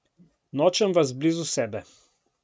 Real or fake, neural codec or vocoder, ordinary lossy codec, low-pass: real; none; none; none